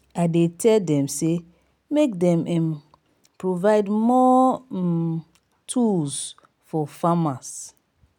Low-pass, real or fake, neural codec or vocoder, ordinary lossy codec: 19.8 kHz; real; none; none